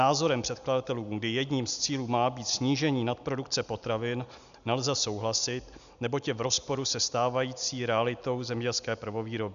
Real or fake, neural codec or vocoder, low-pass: real; none; 7.2 kHz